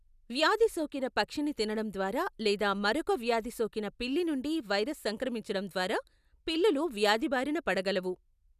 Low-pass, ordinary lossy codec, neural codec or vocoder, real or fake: 14.4 kHz; none; none; real